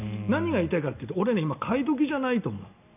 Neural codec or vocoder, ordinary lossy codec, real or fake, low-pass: none; none; real; 3.6 kHz